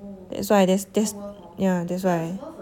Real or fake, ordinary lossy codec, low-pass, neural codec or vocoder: real; none; 19.8 kHz; none